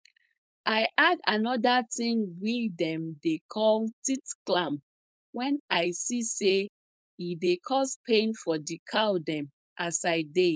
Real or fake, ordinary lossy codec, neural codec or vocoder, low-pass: fake; none; codec, 16 kHz, 4.8 kbps, FACodec; none